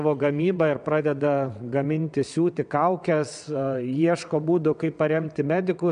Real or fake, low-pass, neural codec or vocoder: fake; 9.9 kHz; vocoder, 22.05 kHz, 80 mel bands, WaveNeXt